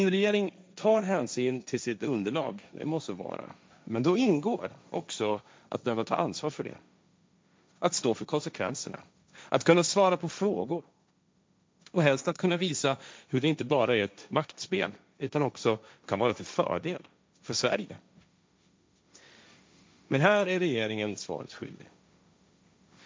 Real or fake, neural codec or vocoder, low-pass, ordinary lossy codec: fake; codec, 16 kHz, 1.1 kbps, Voila-Tokenizer; none; none